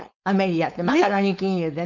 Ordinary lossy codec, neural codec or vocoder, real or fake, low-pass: none; codec, 16 kHz, 4.8 kbps, FACodec; fake; 7.2 kHz